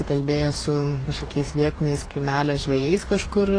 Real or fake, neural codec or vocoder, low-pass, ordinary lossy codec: fake; codec, 44.1 kHz, 2.6 kbps, DAC; 9.9 kHz; AAC, 32 kbps